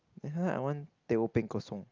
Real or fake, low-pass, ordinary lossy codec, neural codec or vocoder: real; 7.2 kHz; Opus, 24 kbps; none